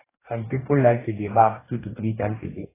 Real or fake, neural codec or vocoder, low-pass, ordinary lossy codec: fake; codec, 44.1 kHz, 2.6 kbps, DAC; 3.6 kHz; AAC, 16 kbps